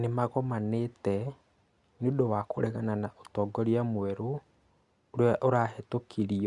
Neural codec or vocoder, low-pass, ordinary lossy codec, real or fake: none; 10.8 kHz; Opus, 64 kbps; real